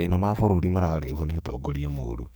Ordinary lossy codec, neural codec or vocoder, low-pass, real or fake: none; codec, 44.1 kHz, 2.6 kbps, SNAC; none; fake